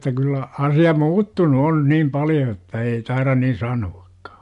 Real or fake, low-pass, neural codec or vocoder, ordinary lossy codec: real; 10.8 kHz; none; MP3, 64 kbps